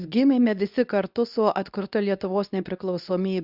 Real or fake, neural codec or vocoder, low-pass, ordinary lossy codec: fake; codec, 24 kHz, 0.9 kbps, WavTokenizer, medium speech release version 2; 5.4 kHz; Opus, 64 kbps